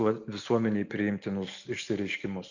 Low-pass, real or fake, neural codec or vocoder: 7.2 kHz; real; none